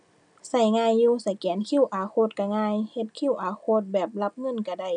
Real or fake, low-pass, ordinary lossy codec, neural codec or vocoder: real; 9.9 kHz; none; none